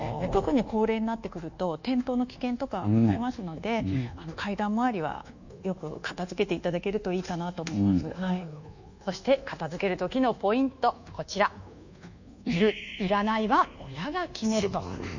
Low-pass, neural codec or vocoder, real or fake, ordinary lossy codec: 7.2 kHz; codec, 24 kHz, 1.2 kbps, DualCodec; fake; none